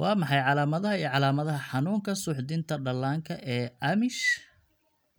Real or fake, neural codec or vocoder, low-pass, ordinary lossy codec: real; none; none; none